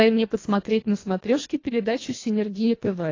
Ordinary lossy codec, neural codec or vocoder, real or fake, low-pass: AAC, 32 kbps; codec, 24 kHz, 1.5 kbps, HILCodec; fake; 7.2 kHz